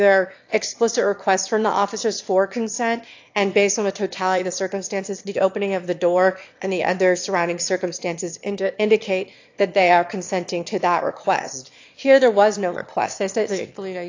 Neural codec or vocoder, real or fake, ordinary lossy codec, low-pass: autoencoder, 22.05 kHz, a latent of 192 numbers a frame, VITS, trained on one speaker; fake; AAC, 48 kbps; 7.2 kHz